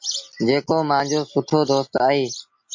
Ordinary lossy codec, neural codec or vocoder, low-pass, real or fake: MP3, 48 kbps; none; 7.2 kHz; real